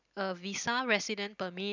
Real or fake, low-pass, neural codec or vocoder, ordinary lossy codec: real; 7.2 kHz; none; none